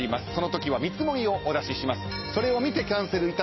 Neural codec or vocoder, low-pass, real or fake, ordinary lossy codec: none; 7.2 kHz; real; MP3, 24 kbps